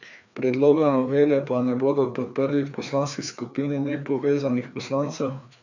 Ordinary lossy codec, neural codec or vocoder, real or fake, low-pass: none; codec, 16 kHz, 2 kbps, FreqCodec, larger model; fake; 7.2 kHz